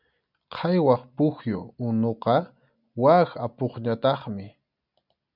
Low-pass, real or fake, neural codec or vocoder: 5.4 kHz; real; none